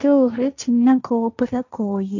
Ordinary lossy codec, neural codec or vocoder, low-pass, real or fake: none; codec, 16 kHz, 1.1 kbps, Voila-Tokenizer; 7.2 kHz; fake